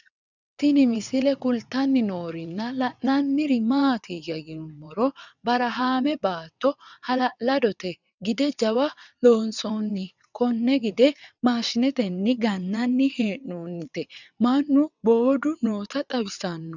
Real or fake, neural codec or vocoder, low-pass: fake; vocoder, 22.05 kHz, 80 mel bands, WaveNeXt; 7.2 kHz